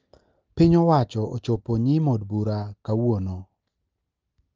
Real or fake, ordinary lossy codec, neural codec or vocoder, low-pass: real; Opus, 24 kbps; none; 7.2 kHz